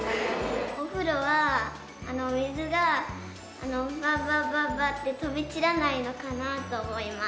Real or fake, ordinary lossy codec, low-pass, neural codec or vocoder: real; none; none; none